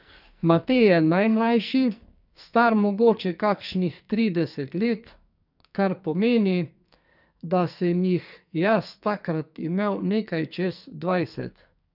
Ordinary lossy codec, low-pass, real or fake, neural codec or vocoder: none; 5.4 kHz; fake; codec, 44.1 kHz, 2.6 kbps, SNAC